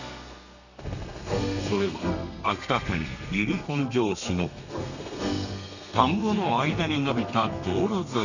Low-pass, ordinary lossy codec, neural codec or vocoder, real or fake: 7.2 kHz; none; codec, 32 kHz, 1.9 kbps, SNAC; fake